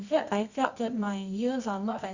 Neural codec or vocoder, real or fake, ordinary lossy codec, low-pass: codec, 24 kHz, 0.9 kbps, WavTokenizer, medium music audio release; fake; Opus, 64 kbps; 7.2 kHz